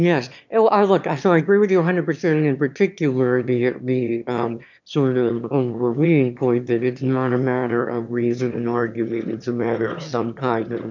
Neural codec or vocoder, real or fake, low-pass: autoencoder, 22.05 kHz, a latent of 192 numbers a frame, VITS, trained on one speaker; fake; 7.2 kHz